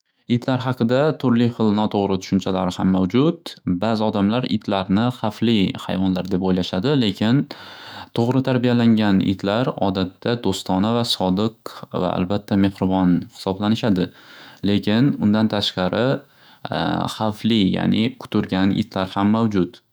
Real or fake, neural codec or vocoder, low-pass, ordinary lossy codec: fake; autoencoder, 48 kHz, 128 numbers a frame, DAC-VAE, trained on Japanese speech; none; none